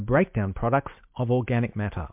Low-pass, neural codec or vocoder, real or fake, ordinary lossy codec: 3.6 kHz; none; real; MP3, 32 kbps